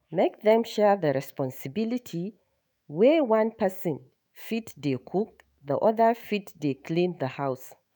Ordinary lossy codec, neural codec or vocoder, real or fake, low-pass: none; autoencoder, 48 kHz, 128 numbers a frame, DAC-VAE, trained on Japanese speech; fake; none